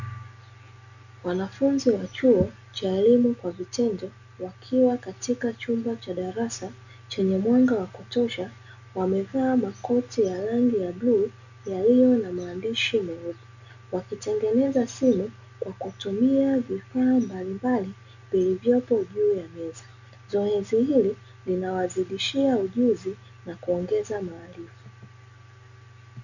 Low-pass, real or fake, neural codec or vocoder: 7.2 kHz; real; none